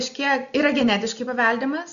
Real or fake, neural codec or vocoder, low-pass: real; none; 7.2 kHz